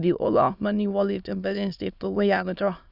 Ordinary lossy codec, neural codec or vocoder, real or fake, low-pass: none; autoencoder, 22.05 kHz, a latent of 192 numbers a frame, VITS, trained on many speakers; fake; 5.4 kHz